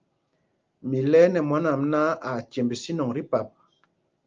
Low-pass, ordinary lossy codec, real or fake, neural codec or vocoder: 7.2 kHz; Opus, 24 kbps; real; none